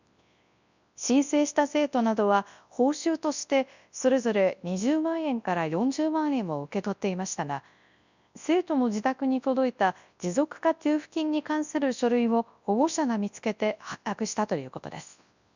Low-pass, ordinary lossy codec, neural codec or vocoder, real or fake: 7.2 kHz; none; codec, 24 kHz, 0.9 kbps, WavTokenizer, large speech release; fake